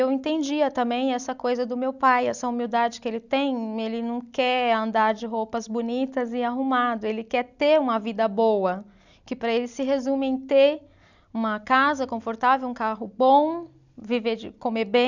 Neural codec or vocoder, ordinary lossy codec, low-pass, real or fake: none; none; 7.2 kHz; real